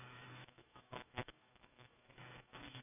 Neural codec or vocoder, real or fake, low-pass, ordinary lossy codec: autoencoder, 48 kHz, 128 numbers a frame, DAC-VAE, trained on Japanese speech; fake; 3.6 kHz; none